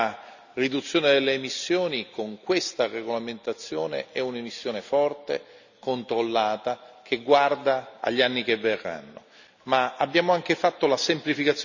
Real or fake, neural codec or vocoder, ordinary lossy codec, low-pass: real; none; none; 7.2 kHz